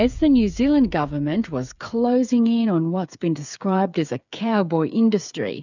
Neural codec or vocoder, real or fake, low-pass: codec, 16 kHz, 8 kbps, FreqCodec, smaller model; fake; 7.2 kHz